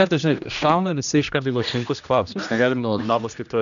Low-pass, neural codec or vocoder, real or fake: 7.2 kHz; codec, 16 kHz, 1 kbps, X-Codec, HuBERT features, trained on general audio; fake